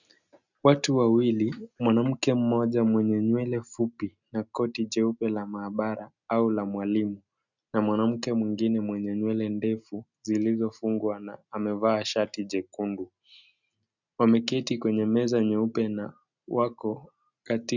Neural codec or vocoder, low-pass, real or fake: none; 7.2 kHz; real